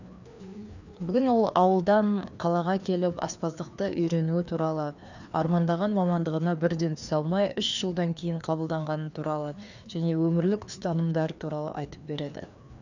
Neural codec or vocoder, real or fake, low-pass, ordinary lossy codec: codec, 16 kHz, 2 kbps, FreqCodec, larger model; fake; 7.2 kHz; none